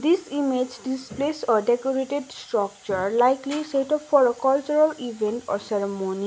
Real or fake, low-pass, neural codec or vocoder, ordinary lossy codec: real; none; none; none